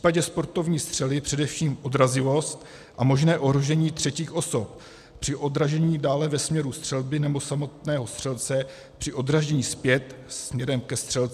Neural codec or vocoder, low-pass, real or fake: none; 14.4 kHz; real